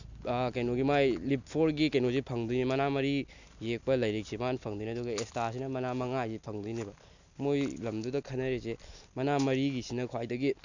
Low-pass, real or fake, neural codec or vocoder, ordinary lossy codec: 7.2 kHz; real; none; none